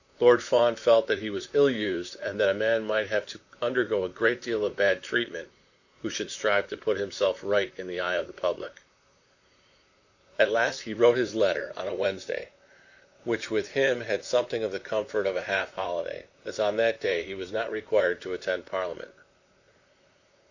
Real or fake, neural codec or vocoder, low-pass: fake; vocoder, 44.1 kHz, 128 mel bands, Pupu-Vocoder; 7.2 kHz